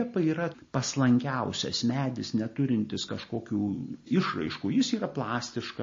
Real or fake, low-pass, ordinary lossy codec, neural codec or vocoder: real; 7.2 kHz; MP3, 32 kbps; none